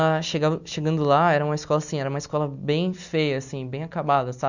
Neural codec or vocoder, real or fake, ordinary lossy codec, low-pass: none; real; none; 7.2 kHz